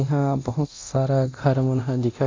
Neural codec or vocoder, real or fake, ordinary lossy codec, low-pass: codec, 24 kHz, 0.9 kbps, DualCodec; fake; MP3, 64 kbps; 7.2 kHz